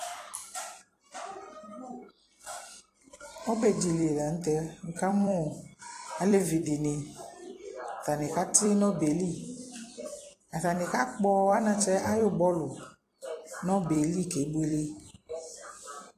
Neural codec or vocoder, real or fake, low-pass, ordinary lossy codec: vocoder, 44.1 kHz, 128 mel bands every 256 samples, BigVGAN v2; fake; 14.4 kHz; AAC, 48 kbps